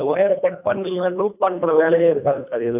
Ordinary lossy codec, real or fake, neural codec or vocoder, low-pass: none; fake; codec, 24 kHz, 1.5 kbps, HILCodec; 3.6 kHz